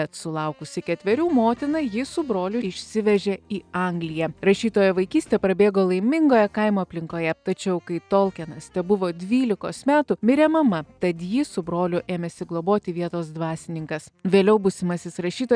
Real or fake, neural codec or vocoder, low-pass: real; none; 9.9 kHz